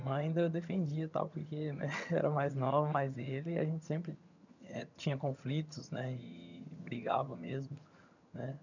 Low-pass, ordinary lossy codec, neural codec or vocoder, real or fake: 7.2 kHz; none; vocoder, 22.05 kHz, 80 mel bands, HiFi-GAN; fake